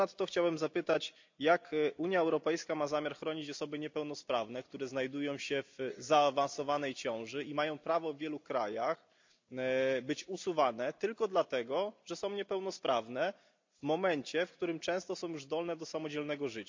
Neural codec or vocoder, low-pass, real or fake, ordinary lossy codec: none; 7.2 kHz; real; MP3, 64 kbps